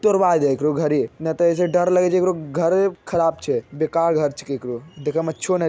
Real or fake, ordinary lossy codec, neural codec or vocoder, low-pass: real; none; none; none